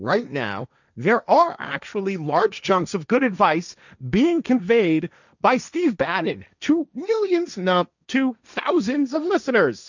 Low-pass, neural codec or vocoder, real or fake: 7.2 kHz; codec, 16 kHz, 1.1 kbps, Voila-Tokenizer; fake